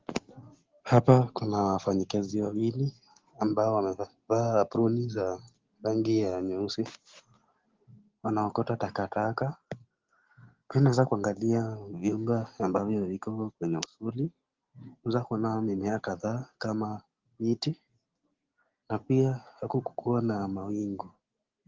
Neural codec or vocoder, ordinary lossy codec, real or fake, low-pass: none; Opus, 16 kbps; real; 7.2 kHz